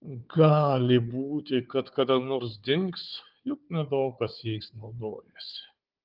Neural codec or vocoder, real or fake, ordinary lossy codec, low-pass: codec, 16 kHz, 4 kbps, FunCodec, trained on Chinese and English, 50 frames a second; fake; Opus, 24 kbps; 5.4 kHz